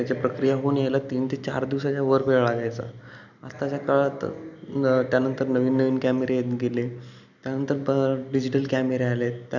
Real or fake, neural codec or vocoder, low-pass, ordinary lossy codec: real; none; 7.2 kHz; none